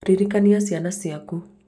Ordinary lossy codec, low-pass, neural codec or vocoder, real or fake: none; none; none; real